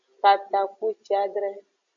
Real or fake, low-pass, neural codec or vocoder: real; 7.2 kHz; none